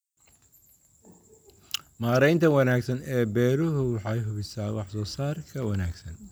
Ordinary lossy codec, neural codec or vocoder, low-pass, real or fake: none; none; none; real